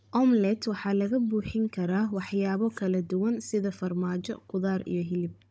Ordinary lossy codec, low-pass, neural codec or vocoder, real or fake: none; none; codec, 16 kHz, 16 kbps, FunCodec, trained on Chinese and English, 50 frames a second; fake